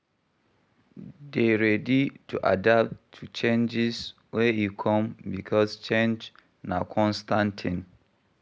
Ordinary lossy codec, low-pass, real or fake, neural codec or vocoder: none; none; real; none